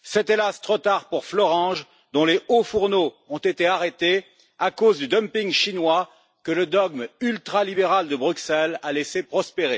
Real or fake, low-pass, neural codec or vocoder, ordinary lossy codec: real; none; none; none